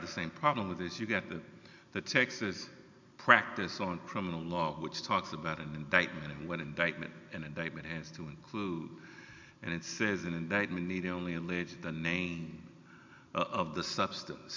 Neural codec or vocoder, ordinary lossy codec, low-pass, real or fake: none; MP3, 64 kbps; 7.2 kHz; real